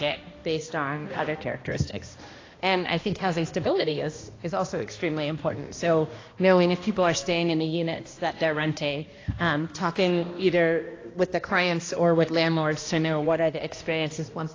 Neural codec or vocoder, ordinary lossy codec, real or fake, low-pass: codec, 16 kHz, 1 kbps, X-Codec, HuBERT features, trained on balanced general audio; AAC, 32 kbps; fake; 7.2 kHz